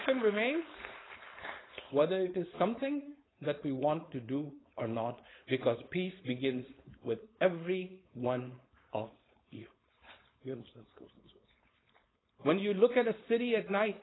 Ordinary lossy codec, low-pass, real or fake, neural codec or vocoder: AAC, 16 kbps; 7.2 kHz; fake; codec, 16 kHz, 4.8 kbps, FACodec